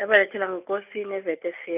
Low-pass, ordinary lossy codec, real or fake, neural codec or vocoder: 3.6 kHz; none; real; none